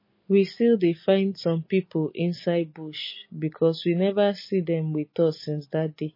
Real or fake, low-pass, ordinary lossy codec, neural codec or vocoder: real; 5.4 kHz; MP3, 24 kbps; none